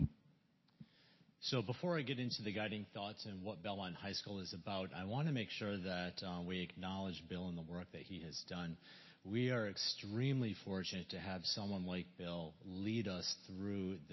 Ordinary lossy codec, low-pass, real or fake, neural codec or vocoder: MP3, 24 kbps; 7.2 kHz; real; none